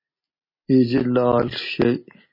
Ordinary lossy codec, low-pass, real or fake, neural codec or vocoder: MP3, 24 kbps; 5.4 kHz; real; none